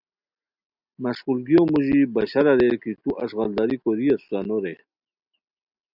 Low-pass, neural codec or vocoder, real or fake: 5.4 kHz; none; real